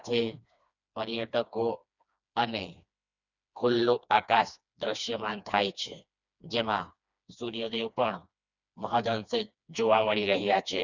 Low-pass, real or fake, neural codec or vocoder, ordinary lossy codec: 7.2 kHz; fake; codec, 16 kHz, 2 kbps, FreqCodec, smaller model; none